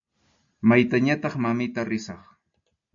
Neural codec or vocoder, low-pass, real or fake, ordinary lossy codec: none; 7.2 kHz; real; AAC, 64 kbps